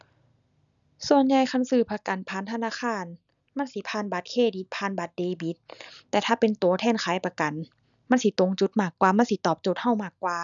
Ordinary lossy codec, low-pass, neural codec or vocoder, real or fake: none; 7.2 kHz; none; real